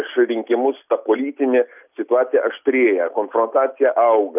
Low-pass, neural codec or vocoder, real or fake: 3.6 kHz; none; real